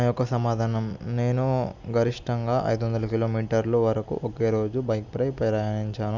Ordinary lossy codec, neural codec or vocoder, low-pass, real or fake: none; none; 7.2 kHz; real